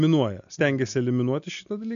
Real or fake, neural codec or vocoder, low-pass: real; none; 7.2 kHz